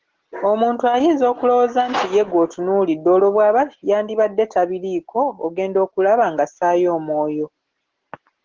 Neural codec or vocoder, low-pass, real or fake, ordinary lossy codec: none; 7.2 kHz; real; Opus, 16 kbps